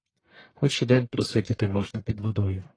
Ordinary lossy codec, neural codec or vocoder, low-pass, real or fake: AAC, 32 kbps; codec, 44.1 kHz, 1.7 kbps, Pupu-Codec; 9.9 kHz; fake